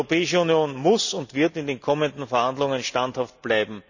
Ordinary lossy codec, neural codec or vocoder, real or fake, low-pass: none; none; real; 7.2 kHz